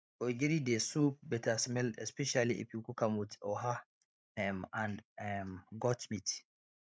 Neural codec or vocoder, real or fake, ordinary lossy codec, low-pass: codec, 16 kHz, 16 kbps, FreqCodec, larger model; fake; none; none